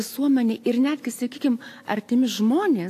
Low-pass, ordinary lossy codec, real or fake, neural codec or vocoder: 14.4 kHz; AAC, 64 kbps; real; none